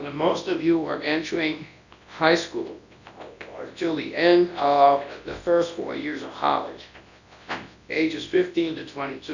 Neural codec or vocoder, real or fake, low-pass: codec, 24 kHz, 0.9 kbps, WavTokenizer, large speech release; fake; 7.2 kHz